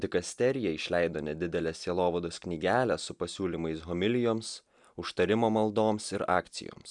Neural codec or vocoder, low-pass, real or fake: none; 10.8 kHz; real